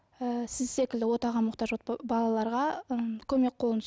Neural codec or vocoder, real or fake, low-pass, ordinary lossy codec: none; real; none; none